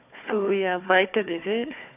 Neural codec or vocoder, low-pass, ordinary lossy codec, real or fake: codec, 16 kHz, 4 kbps, FunCodec, trained on Chinese and English, 50 frames a second; 3.6 kHz; AAC, 32 kbps; fake